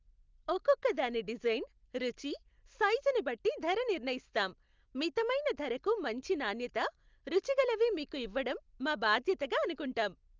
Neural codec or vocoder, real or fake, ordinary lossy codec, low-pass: none; real; Opus, 24 kbps; 7.2 kHz